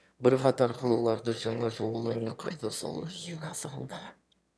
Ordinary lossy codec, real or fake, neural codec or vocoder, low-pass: none; fake; autoencoder, 22.05 kHz, a latent of 192 numbers a frame, VITS, trained on one speaker; none